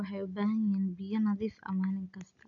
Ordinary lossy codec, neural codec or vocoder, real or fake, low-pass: none; none; real; 7.2 kHz